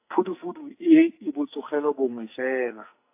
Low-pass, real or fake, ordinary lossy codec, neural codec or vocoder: 3.6 kHz; fake; AAC, 24 kbps; codec, 44.1 kHz, 2.6 kbps, SNAC